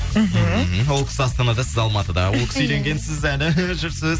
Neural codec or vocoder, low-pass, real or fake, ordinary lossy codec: none; none; real; none